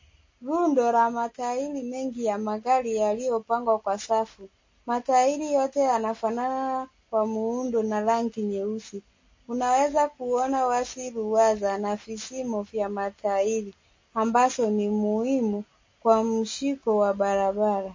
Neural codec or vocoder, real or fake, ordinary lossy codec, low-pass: none; real; MP3, 32 kbps; 7.2 kHz